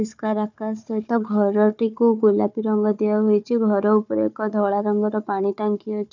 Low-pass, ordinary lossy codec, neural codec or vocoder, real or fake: 7.2 kHz; none; codec, 16 kHz, 4 kbps, FunCodec, trained on Chinese and English, 50 frames a second; fake